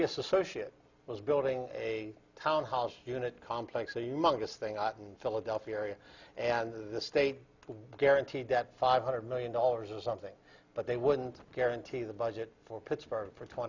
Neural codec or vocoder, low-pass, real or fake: none; 7.2 kHz; real